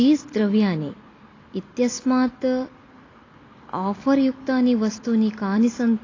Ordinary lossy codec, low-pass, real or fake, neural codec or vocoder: AAC, 32 kbps; 7.2 kHz; real; none